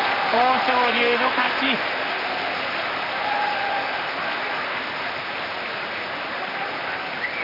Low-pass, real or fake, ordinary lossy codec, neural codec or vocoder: 5.4 kHz; fake; none; vocoder, 44.1 kHz, 128 mel bands, Pupu-Vocoder